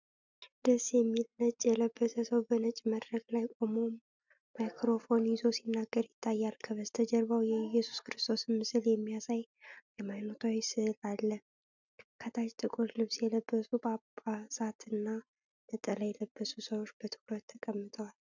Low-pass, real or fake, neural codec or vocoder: 7.2 kHz; real; none